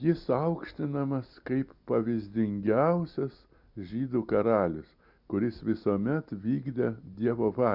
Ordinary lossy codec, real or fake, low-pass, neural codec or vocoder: Opus, 64 kbps; real; 5.4 kHz; none